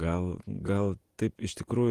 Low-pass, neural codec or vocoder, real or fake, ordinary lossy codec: 14.4 kHz; vocoder, 48 kHz, 128 mel bands, Vocos; fake; Opus, 24 kbps